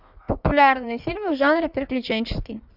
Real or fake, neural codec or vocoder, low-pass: fake; codec, 16 kHz in and 24 kHz out, 1.1 kbps, FireRedTTS-2 codec; 5.4 kHz